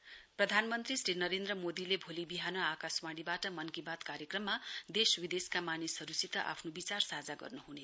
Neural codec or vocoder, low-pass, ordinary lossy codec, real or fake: none; none; none; real